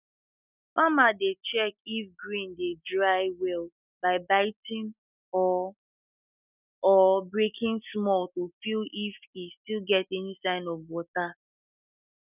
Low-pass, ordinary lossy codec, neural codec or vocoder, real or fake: 3.6 kHz; none; none; real